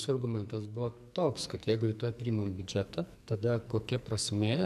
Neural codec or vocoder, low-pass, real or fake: codec, 44.1 kHz, 2.6 kbps, SNAC; 14.4 kHz; fake